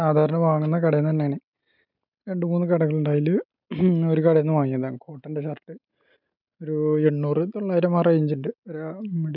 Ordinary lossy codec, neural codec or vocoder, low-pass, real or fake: none; none; 5.4 kHz; real